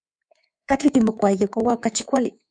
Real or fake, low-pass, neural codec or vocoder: fake; 9.9 kHz; codec, 24 kHz, 3.1 kbps, DualCodec